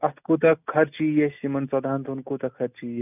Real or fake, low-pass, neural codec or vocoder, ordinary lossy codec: real; 3.6 kHz; none; none